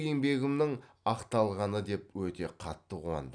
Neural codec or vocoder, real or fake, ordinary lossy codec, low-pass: none; real; none; 9.9 kHz